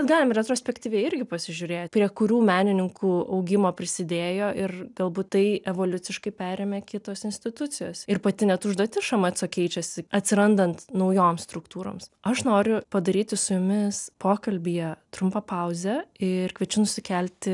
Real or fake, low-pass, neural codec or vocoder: real; 10.8 kHz; none